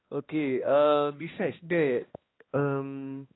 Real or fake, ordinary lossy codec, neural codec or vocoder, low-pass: fake; AAC, 16 kbps; codec, 16 kHz, 1 kbps, X-Codec, HuBERT features, trained on balanced general audio; 7.2 kHz